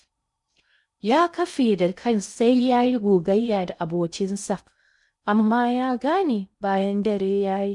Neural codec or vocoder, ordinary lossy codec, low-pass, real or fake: codec, 16 kHz in and 24 kHz out, 0.6 kbps, FocalCodec, streaming, 4096 codes; none; 10.8 kHz; fake